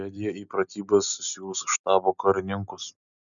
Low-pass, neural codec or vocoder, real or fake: 7.2 kHz; none; real